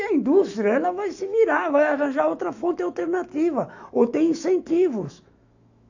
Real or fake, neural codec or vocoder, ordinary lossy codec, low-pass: fake; autoencoder, 48 kHz, 128 numbers a frame, DAC-VAE, trained on Japanese speech; AAC, 48 kbps; 7.2 kHz